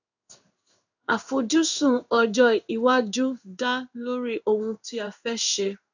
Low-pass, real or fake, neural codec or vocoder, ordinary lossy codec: 7.2 kHz; fake; codec, 16 kHz in and 24 kHz out, 1 kbps, XY-Tokenizer; none